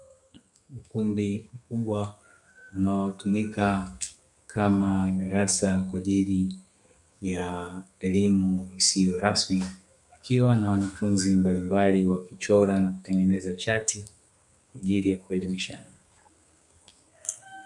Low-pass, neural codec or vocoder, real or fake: 10.8 kHz; codec, 32 kHz, 1.9 kbps, SNAC; fake